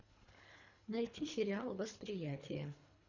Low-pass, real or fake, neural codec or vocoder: 7.2 kHz; fake; codec, 24 kHz, 3 kbps, HILCodec